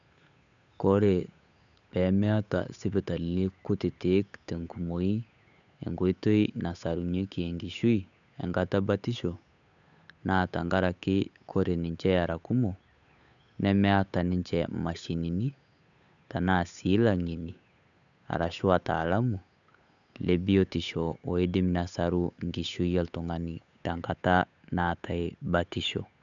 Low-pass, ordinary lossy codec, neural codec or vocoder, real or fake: 7.2 kHz; none; codec, 16 kHz, 8 kbps, FunCodec, trained on Chinese and English, 25 frames a second; fake